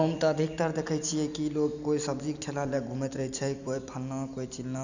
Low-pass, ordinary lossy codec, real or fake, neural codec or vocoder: 7.2 kHz; none; real; none